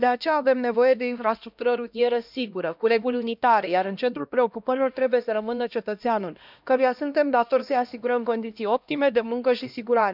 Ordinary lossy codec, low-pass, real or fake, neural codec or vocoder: none; 5.4 kHz; fake; codec, 16 kHz, 1 kbps, X-Codec, HuBERT features, trained on LibriSpeech